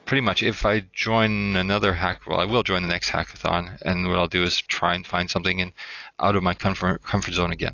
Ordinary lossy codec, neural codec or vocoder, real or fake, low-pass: AAC, 48 kbps; none; real; 7.2 kHz